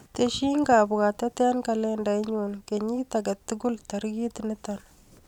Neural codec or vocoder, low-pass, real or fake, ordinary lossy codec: none; 19.8 kHz; real; none